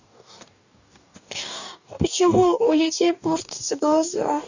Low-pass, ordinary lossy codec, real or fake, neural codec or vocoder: 7.2 kHz; none; fake; codec, 44.1 kHz, 2.6 kbps, DAC